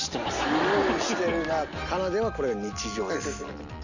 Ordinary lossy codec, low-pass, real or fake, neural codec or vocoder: none; 7.2 kHz; real; none